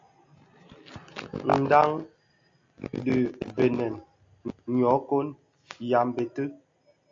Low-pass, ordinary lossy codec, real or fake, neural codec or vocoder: 7.2 kHz; AAC, 48 kbps; real; none